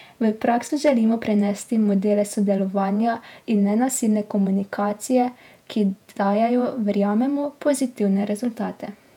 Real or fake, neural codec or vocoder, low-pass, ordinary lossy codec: fake; vocoder, 48 kHz, 128 mel bands, Vocos; 19.8 kHz; none